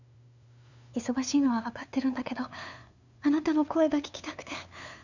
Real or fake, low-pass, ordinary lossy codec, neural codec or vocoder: fake; 7.2 kHz; none; codec, 16 kHz, 2 kbps, FunCodec, trained on LibriTTS, 25 frames a second